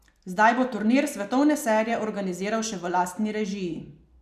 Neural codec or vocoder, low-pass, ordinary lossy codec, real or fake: none; 14.4 kHz; none; real